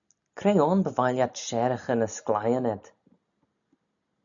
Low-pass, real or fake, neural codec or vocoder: 7.2 kHz; real; none